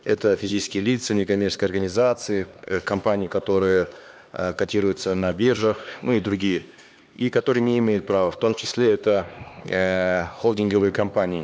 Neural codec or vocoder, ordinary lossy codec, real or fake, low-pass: codec, 16 kHz, 2 kbps, X-Codec, HuBERT features, trained on LibriSpeech; none; fake; none